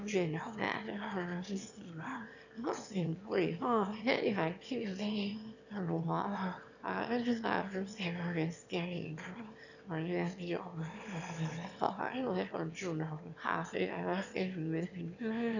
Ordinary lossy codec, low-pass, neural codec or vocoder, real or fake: none; 7.2 kHz; autoencoder, 22.05 kHz, a latent of 192 numbers a frame, VITS, trained on one speaker; fake